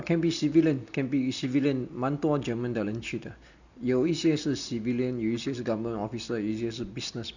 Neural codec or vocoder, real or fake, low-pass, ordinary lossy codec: none; real; 7.2 kHz; none